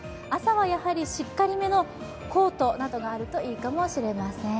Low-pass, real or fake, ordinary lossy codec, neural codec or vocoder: none; real; none; none